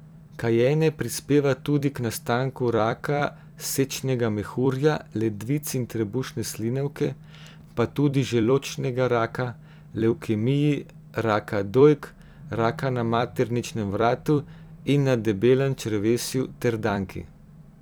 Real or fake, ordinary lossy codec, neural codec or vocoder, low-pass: fake; none; vocoder, 44.1 kHz, 128 mel bands every 256 samples, BigVGAN v2; none